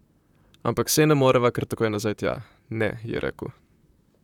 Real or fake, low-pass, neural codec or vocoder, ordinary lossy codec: fake; 19.8 kHz; vocoder, 44.1 kHz, 128 mel bands, Pupu-Vocoder; none